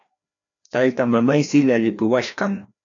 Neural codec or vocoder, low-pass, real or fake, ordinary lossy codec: codec, 16 kHz, 1 kbps, FreqCodec, larger model; 7.2 kHz; fake; AAC, 64 kbps